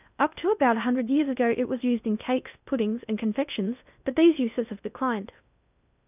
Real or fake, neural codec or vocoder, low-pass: fake; codec, 16 kHz in and 24 kHz out, 0.6 kbps, FocalCodec, streaming, 2048 codes; 3.6 kHz